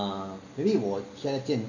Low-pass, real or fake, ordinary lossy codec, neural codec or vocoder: 7.2 kHz; real; none; none